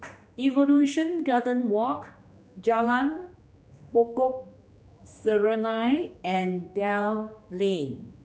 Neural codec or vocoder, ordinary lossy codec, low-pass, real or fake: codec, 16 kHz, 2 kbps, X-Codec, HuBERT features, trained on general audio; none; none; fake